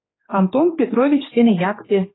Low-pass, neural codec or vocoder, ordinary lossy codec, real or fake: 7.2 kHz; codec, 16 kHz, 2 kbps, X-Codec, HuBERT features, trained on general audio; AAC, 16 kbps; fake